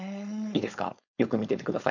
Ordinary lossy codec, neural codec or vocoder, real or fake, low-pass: none; codec, 16 kHz, 4.8 kbps, FACodec; fake; 7.2 kHz